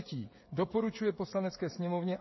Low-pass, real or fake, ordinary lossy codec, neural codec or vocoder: 7.2 kHz; fake; MP3, 24 kbps; codec, 16 kHz, 16 kbps, FreqCodec, smaller model